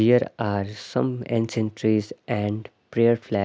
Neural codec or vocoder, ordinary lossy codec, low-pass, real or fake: none; none; none; real